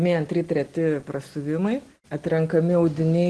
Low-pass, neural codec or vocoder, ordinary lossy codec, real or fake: 10.8 kHz; none; Opus, 16 kbps; real